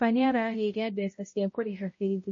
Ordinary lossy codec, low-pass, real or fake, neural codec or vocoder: MP3, 32 kbps; 7.2 kHz; fake; codec, 16 kHz, 0.5 kbps, X-Codec, HuBERT features, trained on balanced general audio